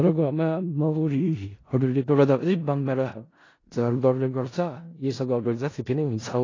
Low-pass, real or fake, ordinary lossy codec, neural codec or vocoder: 7.2 kHz; fake; AAC, 32 kbps; codec, 16 kHz in and 24 kHz out, 0.4 kbps, LongCat-Audio-Codec, four codebook decoder